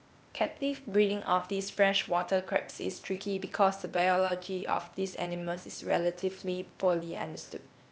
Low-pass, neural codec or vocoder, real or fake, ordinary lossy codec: none; codec, 16 kHz, 0.8 kbps, ZipCodec; fake; none